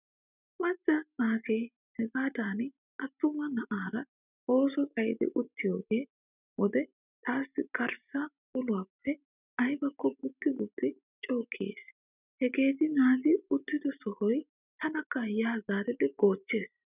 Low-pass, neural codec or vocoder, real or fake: 3.6 kHz; none; real